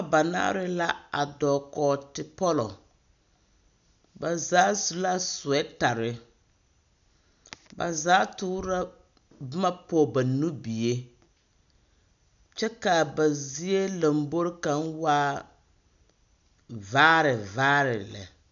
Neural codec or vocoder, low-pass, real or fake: none; 7.2 kHz; real